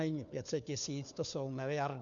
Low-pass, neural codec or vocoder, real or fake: 7.2 kHz; codec, 16 kHz, 4 kbps, FunCodec, trained on LibriTTS, 50 frames a second; fake